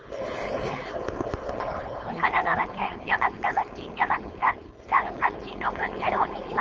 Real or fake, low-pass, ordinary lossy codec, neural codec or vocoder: fake; 7.2 kHz; Opus, 24 kbps; codec, 16 kHz, 4.8 kbps, FACodec